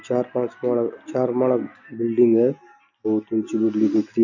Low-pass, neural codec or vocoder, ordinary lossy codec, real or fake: 7.2 kHz; none; none; real